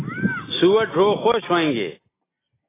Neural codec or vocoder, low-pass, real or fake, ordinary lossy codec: none; 3.6 kHz; real; AAC, 16 kbps